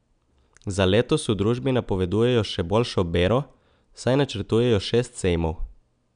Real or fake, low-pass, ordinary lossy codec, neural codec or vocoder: real; 9.9 kHz; none; none